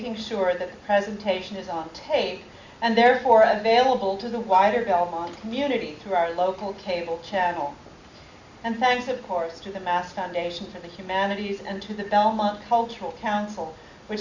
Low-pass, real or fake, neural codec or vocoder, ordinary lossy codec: 7.2 kHz; real; none; Opus, 64 kbps